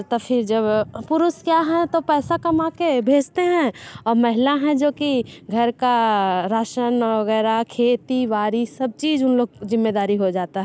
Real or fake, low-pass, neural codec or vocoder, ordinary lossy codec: real; none; none; none